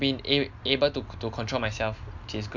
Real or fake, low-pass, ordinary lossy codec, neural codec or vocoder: real; 7.2 kHz; none; none